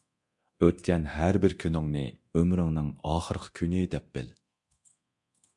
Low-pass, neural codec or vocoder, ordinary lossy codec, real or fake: 10.8 kHz; codec, 24 kHz, 0.9 kbps, DualCodec; MP3, 64 kbps; fake